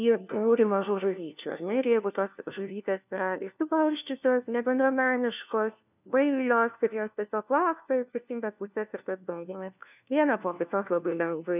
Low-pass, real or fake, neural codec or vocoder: 3.6 kHz; fake; codec, 16 kHz, 1 kbps, FunCodec, trained on LibriTTS, 50 frames a second